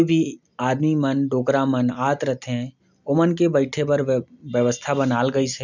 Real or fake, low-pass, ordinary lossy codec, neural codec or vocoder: real; 7.2 kHz; none; none